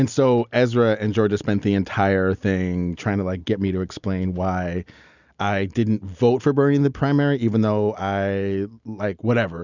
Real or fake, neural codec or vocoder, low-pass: real; none; 7.2 kHz